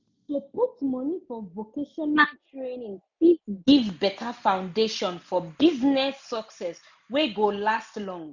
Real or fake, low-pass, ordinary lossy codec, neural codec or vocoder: real; 7.2 kHz; none; none